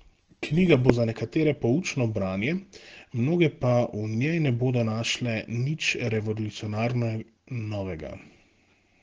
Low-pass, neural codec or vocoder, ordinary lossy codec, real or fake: 7.2 kHz; none; Opus, 16 kbps; real